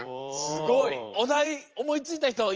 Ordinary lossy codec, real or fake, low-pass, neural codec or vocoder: Opus, 24 kbps; fake; 7.2 kHz; vocoder, 44.1 kHz, 80 mel bands, Vocos